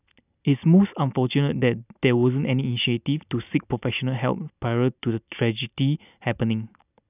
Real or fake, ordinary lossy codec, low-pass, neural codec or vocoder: real; none; 3.6 kHz; none